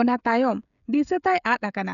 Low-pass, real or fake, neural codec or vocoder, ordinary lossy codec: 7.2 kHz; fake; codec, 16 kHz, 16 kbps, FreqCodec, smaller model; none